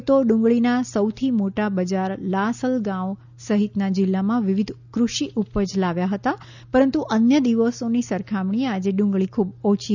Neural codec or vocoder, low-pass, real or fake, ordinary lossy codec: none; 7.2 kHz; real; none